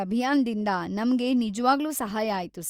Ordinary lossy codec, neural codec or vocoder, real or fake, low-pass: Opus, 32 kbps; none; real; 19.8 kHz